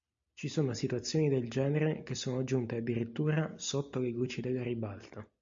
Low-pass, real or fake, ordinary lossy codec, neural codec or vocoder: 7.2 kHz; real; MP3, 48 kbps; none